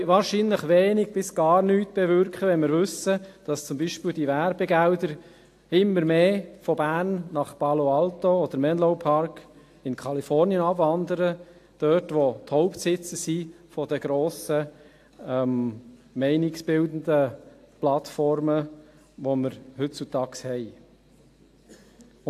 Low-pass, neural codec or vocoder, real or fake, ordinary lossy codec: 14.4 kHz; none; real; AAC, 64 kbps